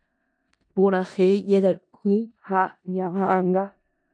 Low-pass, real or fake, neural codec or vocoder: 9.9 kHz; fake; codec, 16 kHz in and 24 kHz out, 0.4 kbps, LongCat-Audio-Codec, four codebook decoder